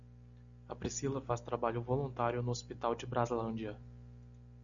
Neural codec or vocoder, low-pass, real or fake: none; 7.2 kHz; real